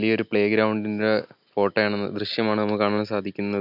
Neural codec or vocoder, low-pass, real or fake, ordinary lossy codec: none; 5.4 kHz; real; none